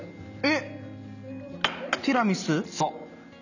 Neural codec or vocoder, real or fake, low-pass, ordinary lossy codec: none; real; 7.2 kHz; none